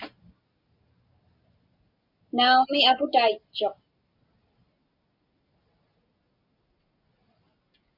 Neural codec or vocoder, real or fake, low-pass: none; real; 5.4 kHz